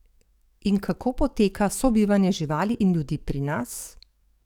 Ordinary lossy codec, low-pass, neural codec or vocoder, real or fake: none; 19.8 kHz; codec, 44.1 kHz, 7.8 kbps, DAC; fake